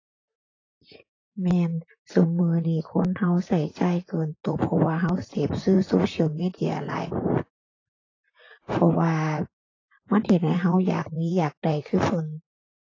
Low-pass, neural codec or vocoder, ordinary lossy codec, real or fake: 7.2 kHz; vocoder, 44.1 kHz, 128 mel bands, Pupu-Vocoder; AAC, 32 kbps; fake